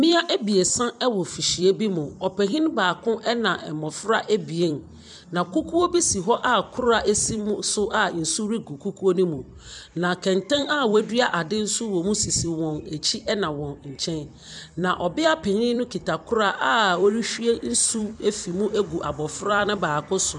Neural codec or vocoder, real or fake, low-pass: vocoder, 44.1 kHz, 128 mel bands every 256 samples, BigVGAN v2; fake; 10.8 kHz